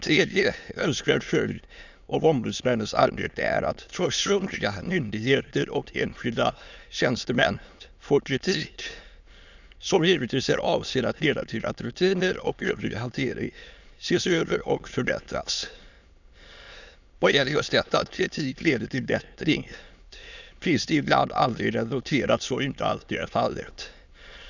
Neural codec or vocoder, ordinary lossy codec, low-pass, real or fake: autoencoder, 22.05 kHz, a latent of 192 numbers a frame, VITS, trained on many speakers; none; 7.2 kHz; fake